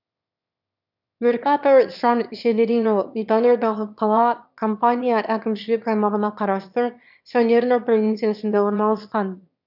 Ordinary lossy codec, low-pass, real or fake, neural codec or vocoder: none; 5.4 kHz; fake; autoencoder, 22.05 kHz, a latent of 192 numbers a frame, VITS, trained on one speaker